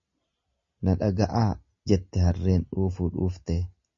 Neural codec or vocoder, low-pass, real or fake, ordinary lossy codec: none; 7.2 kHz; real; MP3, 32 kbps